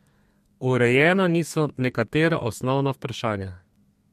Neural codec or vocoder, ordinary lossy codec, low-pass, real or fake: codec, 32 kHz, 1.9 kbps, SNAC; MP3, 64 kbps; 14.4 kHz; fake